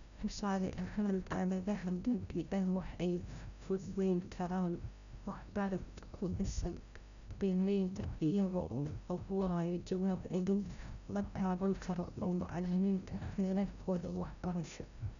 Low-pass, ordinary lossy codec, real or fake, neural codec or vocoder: 7.2 kHz; none; fake; codec, 16 kHz, 0.5 kbps, FreqCodec, larger model